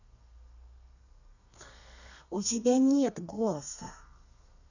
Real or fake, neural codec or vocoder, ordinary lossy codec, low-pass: fake; codec, 32 kHz, 1.9 kbps, SNAC; none; 7.2 kHz